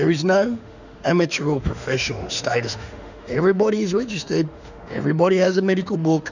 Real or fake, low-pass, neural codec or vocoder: fake; 7.2 kHz; autoencoder, 48 kHz, 32 numbers a frame, DAC-VAE, trained on Japanese speech